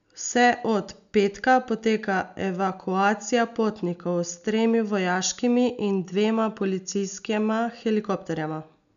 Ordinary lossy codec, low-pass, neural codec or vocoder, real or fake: none; 7.2 kHz; none; real